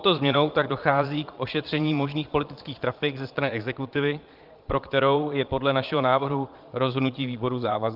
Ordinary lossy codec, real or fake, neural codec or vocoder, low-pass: Opus, 32 kbps; fake; vocoder, 22.05 kHz, 80 mel bands, Vocos; 5.4 kHz